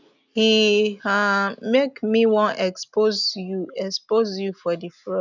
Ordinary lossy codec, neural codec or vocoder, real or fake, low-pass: none; none; real; 7.2 kHz